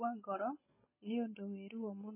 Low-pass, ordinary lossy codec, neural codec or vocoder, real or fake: 3.6 kHz; MP3, 16 kbps; none; real